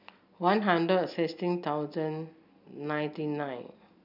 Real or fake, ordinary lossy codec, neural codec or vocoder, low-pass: real; none; none; 5.4 kHz